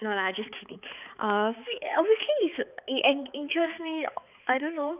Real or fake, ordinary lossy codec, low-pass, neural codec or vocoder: fake; none; 3.6 kHz; codec, 16 kHz, 4 kbps, X-Codec, HuBERT features, trained on balanced general audio